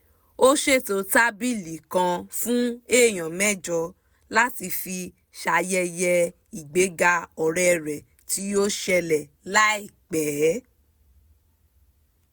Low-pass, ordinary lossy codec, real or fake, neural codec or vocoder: none; none; real; none